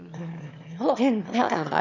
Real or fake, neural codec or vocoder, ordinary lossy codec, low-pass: fake; autoencoder, 22.05 kHz, a latent of 192 numbers a frame, VITS, trained on one speaker; none; 7.2 kHz